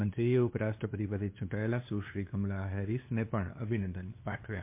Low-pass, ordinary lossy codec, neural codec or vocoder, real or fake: 3.6 kHz; MP3, 24 kbps; codec, 16 kHz, 2 kbps, FunCodec, trained on LibriTTS, 25 frames a second; fake